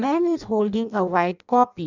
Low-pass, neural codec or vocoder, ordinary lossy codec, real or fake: 7.2 kHz; codec, 16 kHz in and 24 kHz out, 0.6 kbps, FireRedTTS-2 codec; none; fake